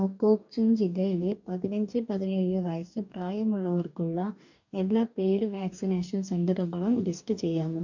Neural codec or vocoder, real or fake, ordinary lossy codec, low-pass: codec, 44.1 kHz, 2.6 kbps, DAC; fake; none; 7.2 kHz